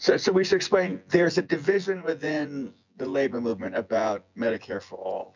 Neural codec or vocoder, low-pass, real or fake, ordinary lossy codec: vocoder, 24 kHz, 100 mel bands, Vocos; 7.2 kHz; fake; MP3, 64 kbps